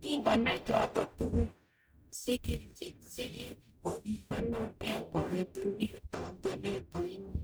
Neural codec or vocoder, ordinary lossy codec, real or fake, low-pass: codec, 44.1 kHz, 0.9 kbps, DAC; none; fake; none